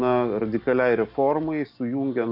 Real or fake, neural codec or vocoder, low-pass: real; none; 5.4 kHz